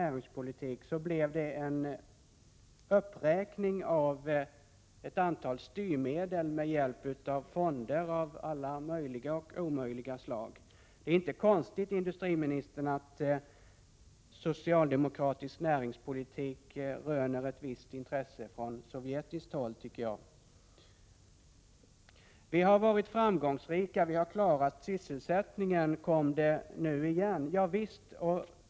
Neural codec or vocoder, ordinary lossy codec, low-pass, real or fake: none; none; none; real